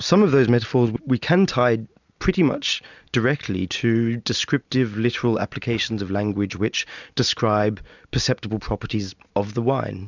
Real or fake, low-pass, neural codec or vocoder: real; 7.2 kHz; none